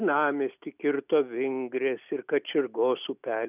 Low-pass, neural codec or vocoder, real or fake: 3.6 kHz; none; real